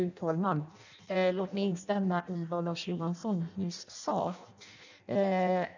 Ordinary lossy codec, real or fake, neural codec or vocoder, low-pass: none; fake; codec, 16 kHz in and 24 kHz out, 0.6 kbps, FireRedTTS-2 codec; 7.2 kHz